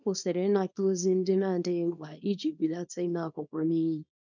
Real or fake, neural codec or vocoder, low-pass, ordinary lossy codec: fake; codec, 24 kHz, 0.9 kbps, WavTokenizer, small release; 7.2 kHz; none